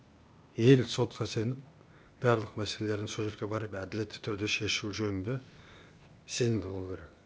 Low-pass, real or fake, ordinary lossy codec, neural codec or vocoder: none; fake; none; codec, 16 kHz, 0.8 kbps, ZipCodec